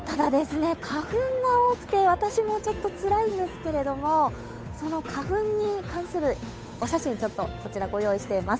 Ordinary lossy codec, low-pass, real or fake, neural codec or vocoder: none; none; fake; codec, 16 kHz, 8 kbps, FunCodec, trained on Chinese and English, 25 frames a second